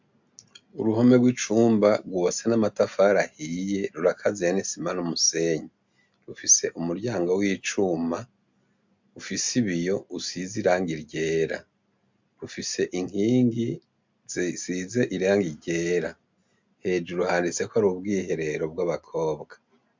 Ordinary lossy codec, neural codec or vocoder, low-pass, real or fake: MP3, 64 kbps; none; 7.2 kHz; real